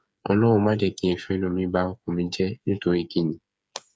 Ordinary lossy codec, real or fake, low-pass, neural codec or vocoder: none; fake; none; codec, 16 kHz, 8 kbps, FreqCodec, smaller model